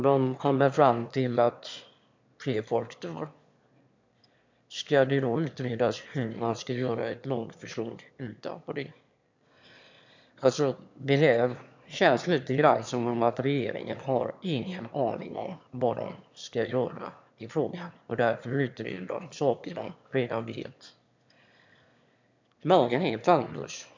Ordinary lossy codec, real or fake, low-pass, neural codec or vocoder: MP3, 64 kbps; fake; 7.2 kHz; autoencoder, 22.05 kHz, a latent of 192 numbers a frame, VITS, trained on one speaker